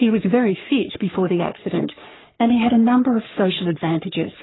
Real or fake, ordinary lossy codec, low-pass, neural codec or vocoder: fake; AAC, 16 kbps; 7.2 kHz; codec, 44.1 kHz, 3.4 kbps, Pupu-Codec